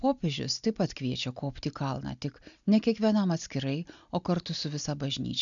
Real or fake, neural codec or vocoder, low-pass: real; none; 7.2 kHz